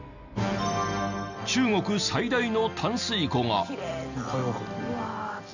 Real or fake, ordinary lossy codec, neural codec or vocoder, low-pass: real; none; none; 7.2 kHz